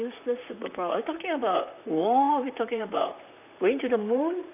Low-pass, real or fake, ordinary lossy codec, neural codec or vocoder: 3.6 kHz; fake; none; vocoder, 44.1 kHz, 128 mel bands, Pupu-Vocoder